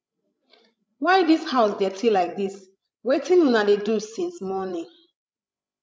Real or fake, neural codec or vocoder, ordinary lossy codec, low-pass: fake; codec, 16 kHz, 16 kbps, FreqCodec, larger model; none; none